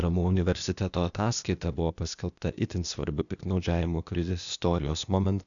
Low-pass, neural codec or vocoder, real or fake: 7.2 kHz; codec, 16 kHz, 0.8 kbps, ZipCodec; fake